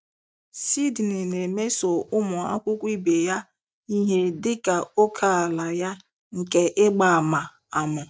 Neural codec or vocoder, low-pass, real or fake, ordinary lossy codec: none; none; real; none